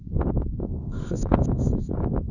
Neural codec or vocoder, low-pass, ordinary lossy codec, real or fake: codec, 24 kHz, 0.9 kbps, WavTokenizer, medium music audio release; 7.2 kHz; none; fake